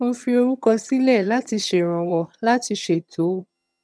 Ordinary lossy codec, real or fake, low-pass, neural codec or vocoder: none; fake; none; vocoder, 22.05 kHz, 80 mel bands, HiFi-GAN